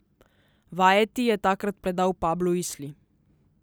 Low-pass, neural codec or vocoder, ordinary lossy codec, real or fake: none; none; none; real